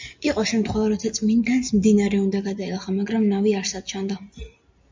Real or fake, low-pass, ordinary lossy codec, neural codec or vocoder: real; 7.2 kHz; MP3, 64 kbps; none